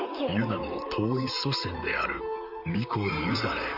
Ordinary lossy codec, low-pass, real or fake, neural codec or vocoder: none; 5.4 kHz; fake; vocoder, 44.1 kHz, 128 mel bands, Pupu-Vocoder